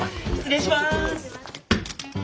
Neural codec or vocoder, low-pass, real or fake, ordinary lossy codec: none; none; real; none